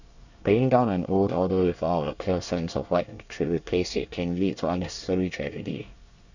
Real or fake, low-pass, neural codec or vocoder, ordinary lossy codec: fake; 7.2 kHz; codec, 24 kHz, 1 kbps, SNAC; none